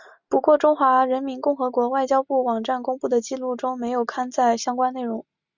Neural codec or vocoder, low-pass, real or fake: none; 7.2 kHz; real